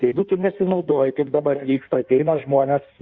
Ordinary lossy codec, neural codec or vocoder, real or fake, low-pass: Opus, 64 kbps; codec, 16 kHz in and 24 kHz out, 1.1 kbps, FireRedTTS-2 codec; fake; 7.2 kHz